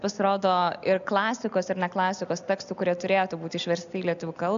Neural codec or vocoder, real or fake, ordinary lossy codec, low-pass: none; real; AAC, 96 kbps; 7.2 kHz